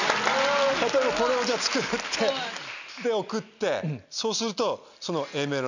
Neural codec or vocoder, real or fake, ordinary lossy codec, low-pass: none; real; none; 7.2 kHz